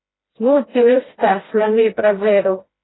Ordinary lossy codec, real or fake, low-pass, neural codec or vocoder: AAC, 16 kbps; fake; 7.2 kHz; codec, 16 kHz, 1 kbps, FreqCodec, smaller model